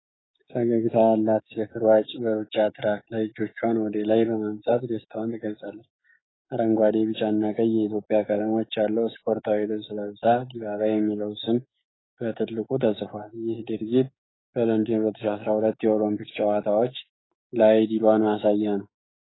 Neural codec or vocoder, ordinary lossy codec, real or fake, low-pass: codec, 16 kHz, 6 kbps, DAC; AAC, 16 kbps; fake; 7.2 kHz